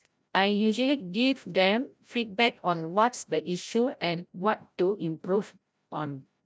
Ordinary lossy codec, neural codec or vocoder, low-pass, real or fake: none; codec, 16 kHz, 0.5 kbps, FreqCodec, larger model; none; fake